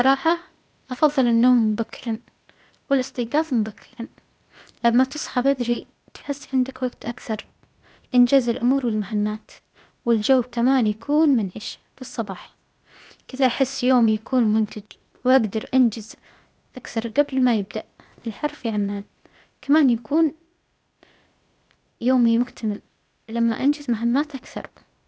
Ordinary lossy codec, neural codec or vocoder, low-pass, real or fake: none; codec, 16 kHz, 0.8 kbps, ZipCodec; none; fake